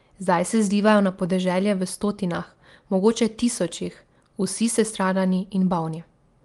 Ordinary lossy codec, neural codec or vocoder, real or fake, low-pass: Opus, 32 kbps; none; real; 10.8 kHz